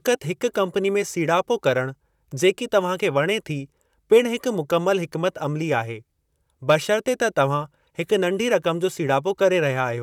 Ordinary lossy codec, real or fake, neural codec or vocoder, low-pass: none; fake; vocoder, 44.1 kHz, 128 mel bands, Pupu-Vocoder; 19.8 kHz